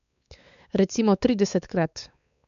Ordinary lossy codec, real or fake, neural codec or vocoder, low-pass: none; fake; codec, 16 kHz, 4 kbps, X-Codec, WavLM features, trained on Multilingual LibriSpeech; 7.2 kHz